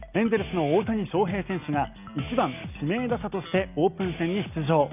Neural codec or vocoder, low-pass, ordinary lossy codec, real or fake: none; 3.6 kHz; none; real